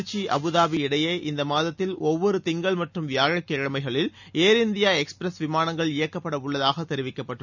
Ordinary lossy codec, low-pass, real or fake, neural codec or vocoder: none; 7.2 kHz; real; none